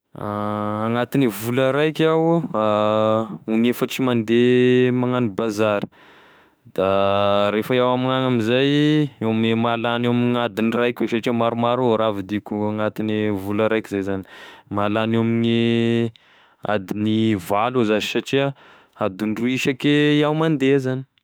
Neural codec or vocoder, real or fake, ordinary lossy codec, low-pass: autoencoder, 48 kHz, 32 numbers a frame, DAC-VAE, trained on Japanese speech; fake; none; none